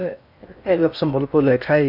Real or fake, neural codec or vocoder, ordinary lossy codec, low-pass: fake; codec, 16 kHz in and 24 kHz out, 0.6 kbps, FocalCodec, streaming, 4096 codes; none; 5.4 kHz